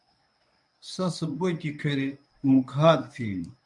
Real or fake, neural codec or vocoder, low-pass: fake; codec, 24 kHz, 0.9 kbps, WavTokenizer, medium speech release version 1; 10.8 kHz